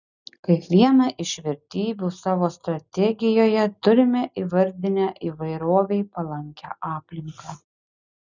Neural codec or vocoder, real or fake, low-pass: none; real; 7.2 kHz